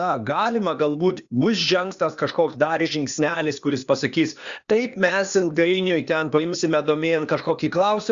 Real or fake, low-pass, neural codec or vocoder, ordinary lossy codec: fake; 7.2 kHz; codec, 16 kHz, 0.8 kbps, ZipCodec; Opus, 64 kbps